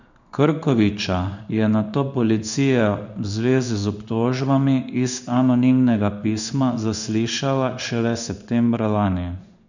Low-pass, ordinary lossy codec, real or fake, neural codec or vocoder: 7.2 kHz; none; fake; codec, 16 kHz in and 24 kHz out, 1 kbps, XY-Tokenizer